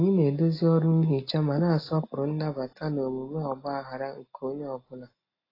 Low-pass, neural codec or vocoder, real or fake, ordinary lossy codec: 5.4 kHz; none; real; AAC, 24 kbps